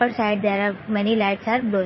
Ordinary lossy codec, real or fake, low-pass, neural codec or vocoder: MP3, 24 kbps; fake; 7.2 kHz; vocoder, 44.1 kHz, 80 mel bands, Vocos